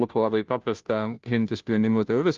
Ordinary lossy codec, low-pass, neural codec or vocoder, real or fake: Opus, 32 kbps; 7.2 kHz; codec, 16 kHz, 0.5 kbps, FunCodec, trained on Chinese and English, 25 frames a second; fake